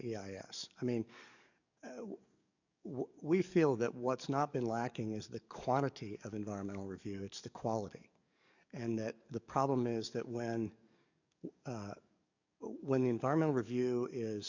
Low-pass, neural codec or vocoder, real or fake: 7.2 kHz; codec, 44.1 kHz, 7.8 kbps, DAC; fake